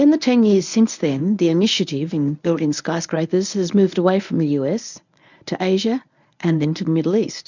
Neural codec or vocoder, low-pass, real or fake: codec, 24 kHz, 0.9 kbps, WavTokenizer, medium speech release version 1; 7.2 kHz; fake